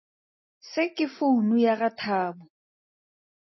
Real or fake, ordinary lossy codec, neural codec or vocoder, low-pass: real; MP3, 24 kbps; none; 7.2 kHz